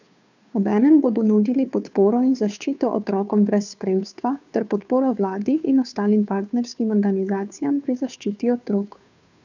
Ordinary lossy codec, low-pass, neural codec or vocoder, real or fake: none; 7.2 kHz; codec, 16 kHz, 2 kbps, FunCodec, trained on Chinese and English, 25 frames a second; fake